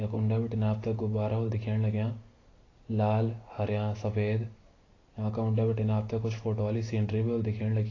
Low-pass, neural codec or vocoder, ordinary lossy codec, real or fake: 7.2 kHz; none; AAC, 32 kbps; real